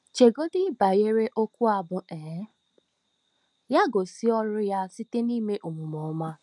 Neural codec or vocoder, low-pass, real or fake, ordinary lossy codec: none; 10.8 kHz; real; none